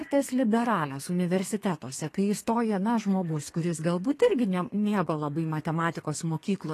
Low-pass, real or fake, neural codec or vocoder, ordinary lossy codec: 14.4 kHz; fake; codec, 32 kHz, 1.9 kbps, SNAC; AAC, 48 kbps